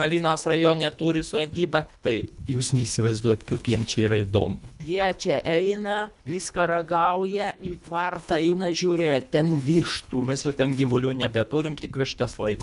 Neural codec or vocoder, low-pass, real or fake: codec, 24 kHz, 1.5 kbps, HILCodec; 10.8 kHz; fake